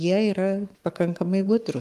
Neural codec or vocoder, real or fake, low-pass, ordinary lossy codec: codec, 44.1 kHz, 7.8 kbps, Pupu-Codec; fake; 14.4 kHz; Opus, 32 kbps